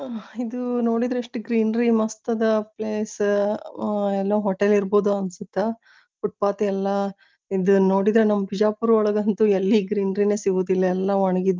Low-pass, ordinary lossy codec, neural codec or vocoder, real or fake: 7.2 kHz; Opus, 24 kbps; none; real